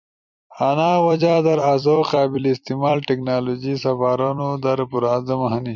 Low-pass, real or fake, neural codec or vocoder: 7.2 kHz; fake; vocoder, 44.1 kHz, 128 mel bands every 256 samples, BigVGAN v2